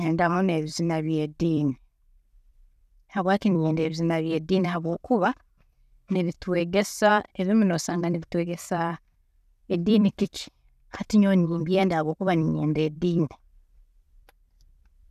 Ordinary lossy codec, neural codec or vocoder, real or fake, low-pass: none; vocoder, 44.1 kHz, 128 mel bands every 256 samples, BigVGAN v2; fake; 14.4 kHz